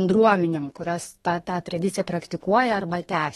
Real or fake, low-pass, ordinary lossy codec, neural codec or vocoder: fake; 14.4 kHz; AAC, 32 kbps; codec, 32 kHz, 1.9 kbps, SNAC